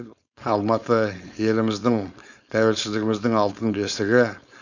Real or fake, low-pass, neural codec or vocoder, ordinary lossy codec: fake; 7.2 kHz; codec, 16 kHz, 4.8 kbps, FACodec; none